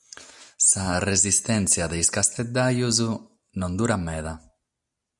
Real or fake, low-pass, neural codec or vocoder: real; 10.8 kHz; none